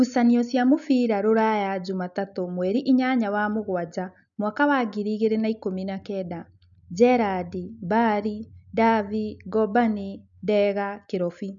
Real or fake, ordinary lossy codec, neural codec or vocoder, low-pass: real; none; none; 7.2 kHz